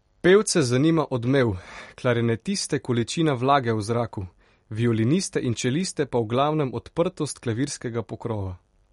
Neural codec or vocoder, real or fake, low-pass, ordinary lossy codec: none; real; 19.8 kHz; MP3, 48 kbps